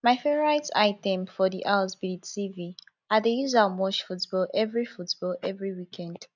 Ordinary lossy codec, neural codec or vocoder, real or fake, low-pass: none; none; real; 7.2 kHz